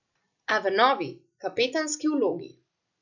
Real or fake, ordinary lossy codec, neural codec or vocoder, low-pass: real; none; none; 7.2 kHz